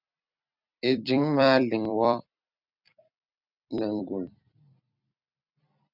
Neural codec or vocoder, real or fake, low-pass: vocoder, 44.1 kHz, 128 mel bands every 256 samples, BigVGAN v2; fake; 5.4 kHz